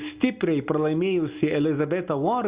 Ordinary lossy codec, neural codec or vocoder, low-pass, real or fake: Opus, 64 kbps; none; 3.6 kHz; real